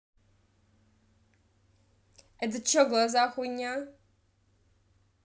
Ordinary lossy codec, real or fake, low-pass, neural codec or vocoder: none; real; none; none